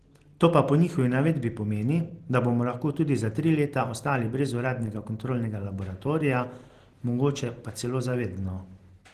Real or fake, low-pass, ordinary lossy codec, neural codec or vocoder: real; 14.4 kHz; Opus, 16 kbps; none